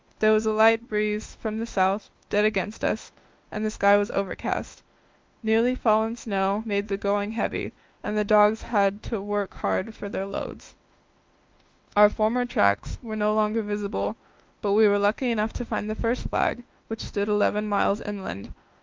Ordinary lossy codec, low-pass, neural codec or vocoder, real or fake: Opus, 32 kbps; 7.2 kHz; autoencoder, 48 kHz, 32 numbers a frame, DAC-VAE, trained on Japanese speech; fake